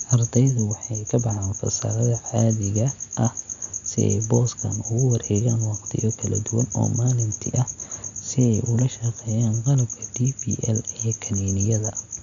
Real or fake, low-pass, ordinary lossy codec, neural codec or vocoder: real; 7.2 kHz; none; none